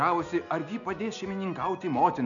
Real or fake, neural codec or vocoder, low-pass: real; none; 7.2 kHz